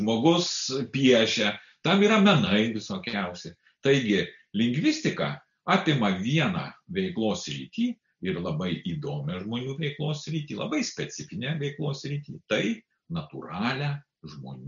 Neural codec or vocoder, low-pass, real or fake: none; 7.2 kHz; real